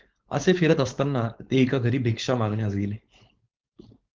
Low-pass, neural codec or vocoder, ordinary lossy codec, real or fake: 7.2 kHz; codec, 16 kHz, 4.8 kbps, FACodec; Opus, 16 kbps; fake